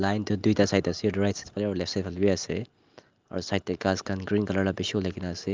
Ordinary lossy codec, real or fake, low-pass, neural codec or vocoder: Opus, 24 kbps; real; 7.2 kHz; none